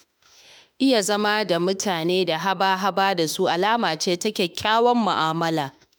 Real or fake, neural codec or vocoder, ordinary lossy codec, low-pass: fake; autoencoder, 48 kHz, 32 numbers a frame, DAC-VAE, trained on Japanese speech; none; none